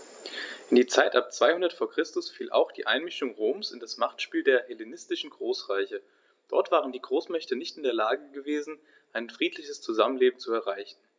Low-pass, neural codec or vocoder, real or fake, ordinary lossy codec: none; none; real; none